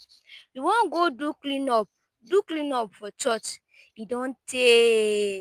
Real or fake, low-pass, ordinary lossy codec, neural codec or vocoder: real; 14.4 kHz; Opus, 32 kbps; none